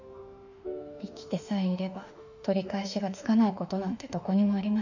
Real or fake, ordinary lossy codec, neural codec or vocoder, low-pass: fake; none; autoencoder, 48 kHz, 32 numbers a frame, DAC-VAE, trained on Japanese speech; 7.2 kHz